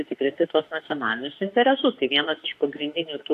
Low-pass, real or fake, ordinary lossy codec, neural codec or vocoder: 14.4 kHz; fake; AAC, 64 kbps; autoencoder, 48 kHz, 32 numbers a frame, DAC-VAE, trained on Japanese speech